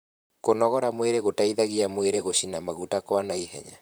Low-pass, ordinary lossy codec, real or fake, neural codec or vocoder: none; none; fake; vocoder, 44.1 kHz, 128 mel bands, Pupu-Vocoder